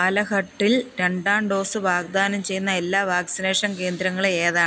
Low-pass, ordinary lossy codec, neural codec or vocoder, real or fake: none; none; none; real